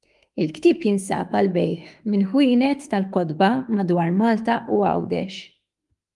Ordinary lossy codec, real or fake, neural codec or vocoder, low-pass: Opus, 32 kbps; fake; autoencoder, 48 kHz, 32 numbers a frame, DAC-VAE, trained on Japanese speech; 10.8 kHz